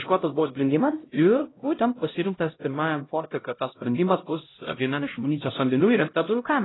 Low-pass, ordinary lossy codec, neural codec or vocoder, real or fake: 7.2 kHz; AAC, 16 kbps; codec, 16 kHz, 0.5 kbps, X-Codec, HuBERT features, trained on LibriSpeech; fake